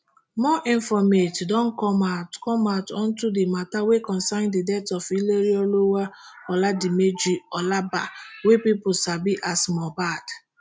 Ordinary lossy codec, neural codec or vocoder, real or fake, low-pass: none; none; real; none